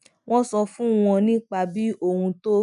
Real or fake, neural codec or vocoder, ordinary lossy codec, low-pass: real; none; none; 10.8 kHz